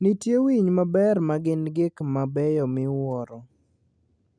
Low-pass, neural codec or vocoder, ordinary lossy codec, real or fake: 9.9 kHz; none; MP3, 96 kbps; real